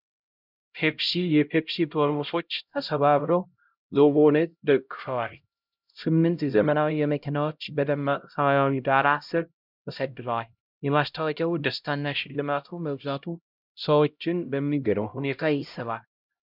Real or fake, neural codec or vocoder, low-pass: fake; codec, 16 kHz, 0.5 kbps, X-Codec, HuBERT features, trained on LibriSpeech; 5.4 kHz